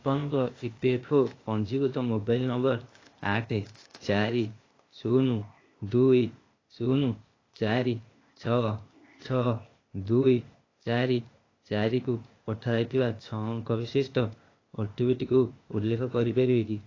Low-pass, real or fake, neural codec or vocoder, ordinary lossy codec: 7.2 kHz; fake; codec, 16 kHz, 0.8 kbps, ZipCodec; AAC, 32 kbps